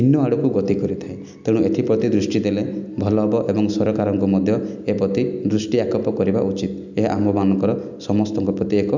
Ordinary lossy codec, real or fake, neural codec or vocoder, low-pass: none; real; none; 7.2 kHz